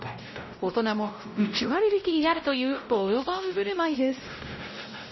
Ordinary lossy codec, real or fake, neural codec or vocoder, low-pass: MP3, 24 kbps; fake; codec, 16 kHz, 0.5 kbps, X-Codec, WavLM features, trained on Multilingual LibriSpeech; 7.2 kHz